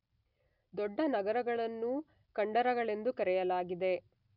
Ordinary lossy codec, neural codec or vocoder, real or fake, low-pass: none; none; real; 5.4 kHz